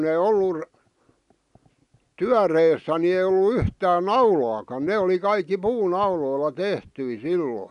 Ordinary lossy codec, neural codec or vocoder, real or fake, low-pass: none; none; real; 10.8 kHz